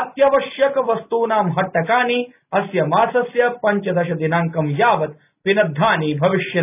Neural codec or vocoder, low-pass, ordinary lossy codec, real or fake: none; 3.6 kHz; none; real